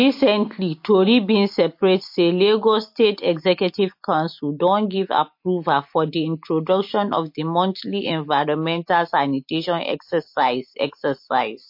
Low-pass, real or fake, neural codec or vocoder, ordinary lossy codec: 5.4 kHz; real; none; MP3, 32 kbps